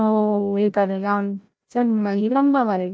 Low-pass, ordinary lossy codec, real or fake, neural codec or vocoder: none; none; fake; codec, 16 kHz, 0.5 kbps, FreqCodec, larger model